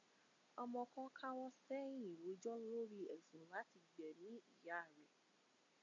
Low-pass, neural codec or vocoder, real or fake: 7.2 kHz; none; real